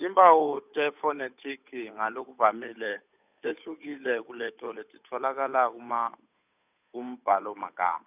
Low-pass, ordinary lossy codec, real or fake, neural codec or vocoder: 3.6 kHz; none; fake; codec, 16 kHz, 8 kbps, FunCodec, trained on Chinese and English, 25 frames a second